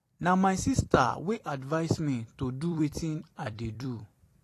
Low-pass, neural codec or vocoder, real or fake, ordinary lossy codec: 14.4 kHz; vocoder, 44.1 kHz, 128 mel bands every 256 samples, BigVGAN v2; fake; AAC, 48 kbps